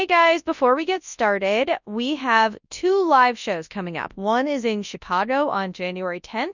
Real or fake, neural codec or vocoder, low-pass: fake; codec, 24 kHz, 0.9 kbps, WavTokenizer, large speech release; 7.2 kHz